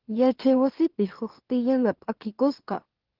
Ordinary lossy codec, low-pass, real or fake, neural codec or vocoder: Opus, 16 kbps; 5.4 kHz; fake; autoencoder, 44.1 kHz, a latent of 192 numbers a frame, MeloTTS